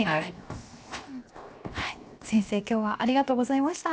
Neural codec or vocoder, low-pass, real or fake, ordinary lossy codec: codec, 16 kHz, 0.7 kbps, FocalCodec; none; fake; none